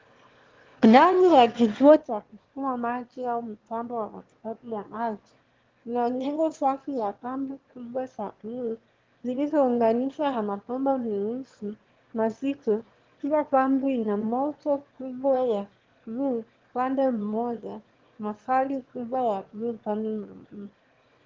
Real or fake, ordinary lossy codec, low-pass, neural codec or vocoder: fake; Opus, 16 kbps; 7.2 kHz; autoencoder, 22.05 kHz, a latent of 192 numbers a frame, VITS, trained on one speaker